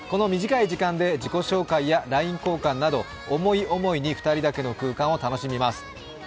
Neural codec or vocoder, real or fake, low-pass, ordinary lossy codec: none; real; none; none